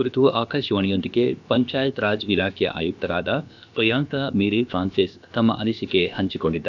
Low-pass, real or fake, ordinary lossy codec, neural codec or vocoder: 7.2 kHz; fake; none; codec, 16 kHz, about 1 kbps, DyCAST, with the encoder's durations